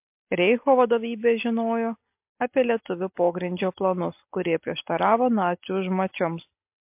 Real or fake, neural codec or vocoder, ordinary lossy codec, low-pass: real; none; MP3, 32 kbps; 3.6 kHz